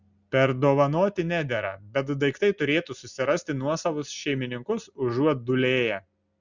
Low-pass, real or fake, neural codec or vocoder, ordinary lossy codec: 7.2 kHz; real; none; Opus, 64 kbps